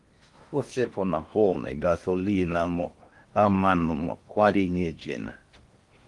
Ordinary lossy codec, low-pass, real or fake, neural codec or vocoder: Opus, 24 kbps; 10.8 kHz; fake; codec, 16 kHz in and 24 kHz out, 0.6 kbps, FocalCodec, streaming, 4096 codes